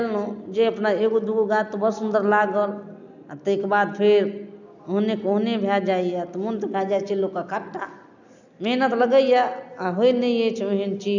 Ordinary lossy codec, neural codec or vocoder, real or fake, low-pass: none; none; real; 7.2 kHz